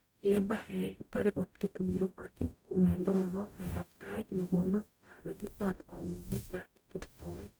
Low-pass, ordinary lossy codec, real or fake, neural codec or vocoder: none; none; fake; codec, 44.1 kHz, 0.9 kbps, DAC